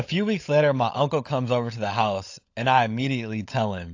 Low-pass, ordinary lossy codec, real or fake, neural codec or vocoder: 7.2 kHz; AAC, 48 kbps; fake; codec, 16 kHz, 16 kbps, FunCodec, trained on LibriTTS, 50 frames a second